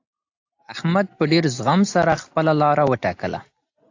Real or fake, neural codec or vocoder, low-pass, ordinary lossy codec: real; none; 7.2 kHz; AAC, 48 kbps